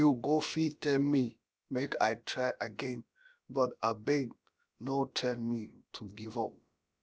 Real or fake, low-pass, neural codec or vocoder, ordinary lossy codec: fake; none; codec, 16 kHz, about 1 kbps, DyCAST, with the encoder's durations; none